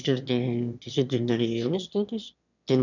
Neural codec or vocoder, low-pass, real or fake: autoencoder, 22.05 kHz, a latent of 192 numbers a frame, VITS, trained on one speaker; 7.2 kHz; fake